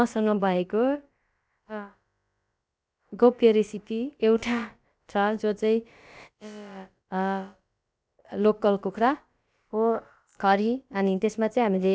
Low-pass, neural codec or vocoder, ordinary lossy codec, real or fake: none; codec, 16 kHz, about 1 kbps, DyCAST, with the encoder's durations; none; fake